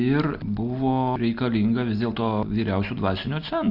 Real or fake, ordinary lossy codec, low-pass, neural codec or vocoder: real; Opus, 64 kbps; 5.4 kHz; none